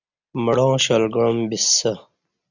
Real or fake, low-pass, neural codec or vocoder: real; 7.2 kHz; none